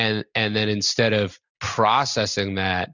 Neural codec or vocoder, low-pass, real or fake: none; 7.2 kHz; real